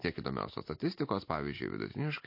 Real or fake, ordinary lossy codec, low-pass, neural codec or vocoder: real; MP3, 32 kbps; 5.4 kHz; none